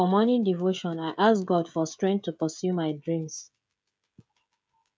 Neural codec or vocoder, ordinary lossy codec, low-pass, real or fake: codec, 16 kHz, 16 kbps, FreqCodec, smaller model; none; none; fake